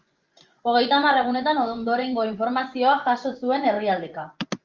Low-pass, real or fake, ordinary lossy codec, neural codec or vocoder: 7.2 kHz; fake; Opus, 32 kbps; vocoder, 24 kHz, 100 mel bands, Vocos